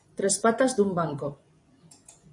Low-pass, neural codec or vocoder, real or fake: 10.8 kHz; vocoder, 24 kHz, 100 mel bands, Vocos; fake